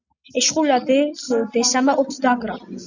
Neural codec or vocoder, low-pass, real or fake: none; 7.2 kHz; real